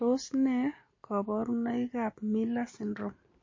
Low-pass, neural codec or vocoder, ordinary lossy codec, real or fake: 7.2 kHz; none; MP3, 32 kbps; real